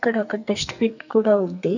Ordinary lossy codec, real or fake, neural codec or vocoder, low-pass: none; fake; codec, 44.1 kHz, 2.6 kbps, SNAC; 7.2 kHz